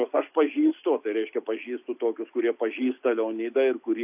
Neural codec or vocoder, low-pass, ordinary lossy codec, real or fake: none; 3.6 kHz; AAC, 32 kbps; real